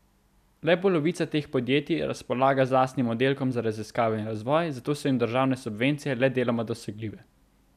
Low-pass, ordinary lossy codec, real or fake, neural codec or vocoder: 14.4 kHz; none; real; none